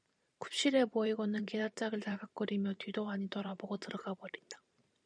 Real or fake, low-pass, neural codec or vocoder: fake; 9.9 kHz; vocoder, 44.1 kHz, 128 mel bands every 512 samples, BigVGAN v2